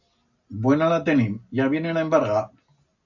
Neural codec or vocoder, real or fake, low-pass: none; real; 7.2 kHz